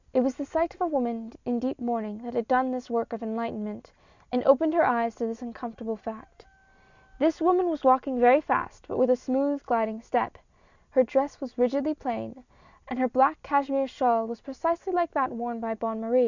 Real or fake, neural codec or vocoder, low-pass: real; none; 7.2 kHz